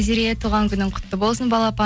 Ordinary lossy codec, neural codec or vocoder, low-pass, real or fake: none; none; none; real